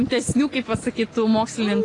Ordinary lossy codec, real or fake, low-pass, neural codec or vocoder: AAC, 32 kbps; real; 10.8 kHz; none